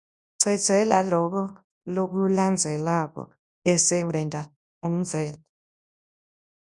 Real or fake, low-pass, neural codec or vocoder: fake; 10.8 kHz; codec, 24 kHz, 0.9 kbps, WavTokenizer, large speech release